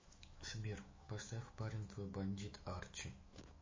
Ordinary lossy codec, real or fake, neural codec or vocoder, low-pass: MP3, 32 kbps; fake; autoencoder, 48 kHz, 128 numbers a frame, DAC-VAE, trained on Japanese speech; 7.2 kHz